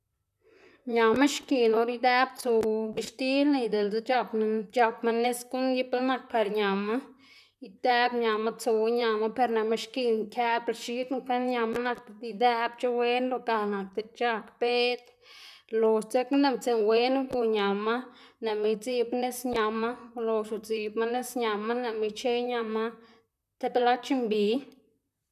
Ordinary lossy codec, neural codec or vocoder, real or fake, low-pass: none; vocoder, 44.1 kHz, 128 mel bands, Pupu-Vocoder; fake; 14.4 kHz